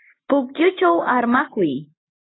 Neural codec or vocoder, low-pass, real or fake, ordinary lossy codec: none; 7.2 kHz; real; AAC, 16 kbps